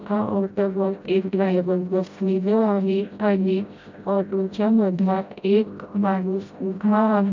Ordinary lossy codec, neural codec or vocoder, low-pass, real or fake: MP3, 64 kbps; codec, 16 kHz, 0.5 kbps, FreqCodec, smaller model; 7.2 kHz; fake